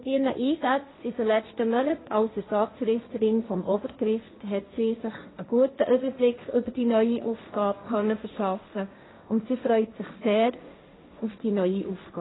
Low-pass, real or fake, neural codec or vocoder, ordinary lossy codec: 7.2 kHz; fake; codec, 16 kHz, 1.1 kbps, Voila-Tokenizer; AAC, 16 kbps